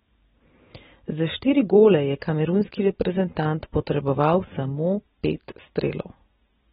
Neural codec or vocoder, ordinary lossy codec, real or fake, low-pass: none; AAC, 16 kbps; real; 7.2 kHz